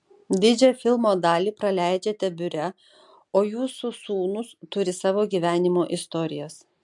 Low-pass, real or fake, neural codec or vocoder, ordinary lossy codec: 10.8 kHz; real; none; MP3, 64 kbps